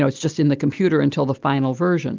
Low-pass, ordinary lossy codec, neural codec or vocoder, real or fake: 7.2 kHz; Opus, 24 kbps; none; real